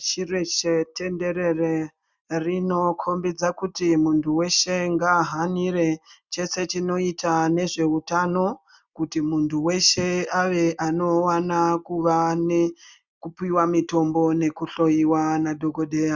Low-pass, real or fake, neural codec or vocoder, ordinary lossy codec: 7.2 kHz; real; none; Opus, 64 kbps